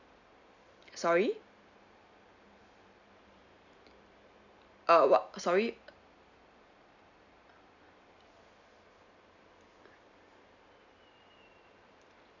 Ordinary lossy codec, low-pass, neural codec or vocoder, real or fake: none; 7.2 kHz; none; real